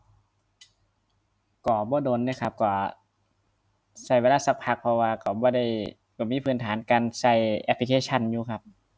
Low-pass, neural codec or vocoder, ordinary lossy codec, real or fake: none; none; none; real